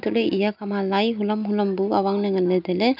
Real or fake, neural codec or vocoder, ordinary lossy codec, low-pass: real; none; none; 5.4 kHz